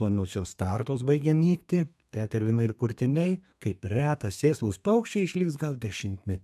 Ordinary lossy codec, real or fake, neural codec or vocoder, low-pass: MP3, 96 kbps; fake; codec, 32 kHz, 1.9 kbps, SNAC; 14.4 kHz